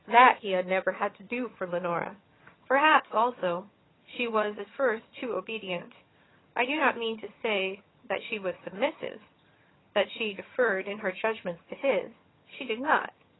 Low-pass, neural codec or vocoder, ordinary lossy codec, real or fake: 7.2 kHz; vocoder, 22.05 kHz, 80 mel bands, HiFi-GAN; AAC, 16 kbps; fake